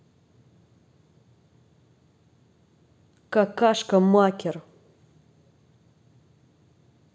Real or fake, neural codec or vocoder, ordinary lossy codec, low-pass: real; none; none; none